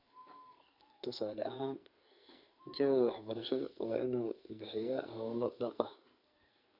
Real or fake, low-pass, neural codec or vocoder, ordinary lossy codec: fake; 5.4 kHz; codec, 44.1 kHz, 2.6 kbps, SNAC; none